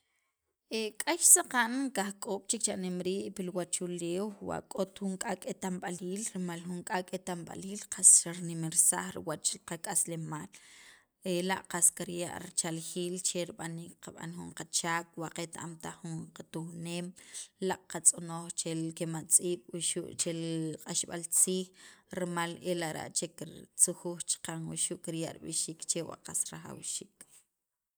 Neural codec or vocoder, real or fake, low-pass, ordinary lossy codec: none; real; none; none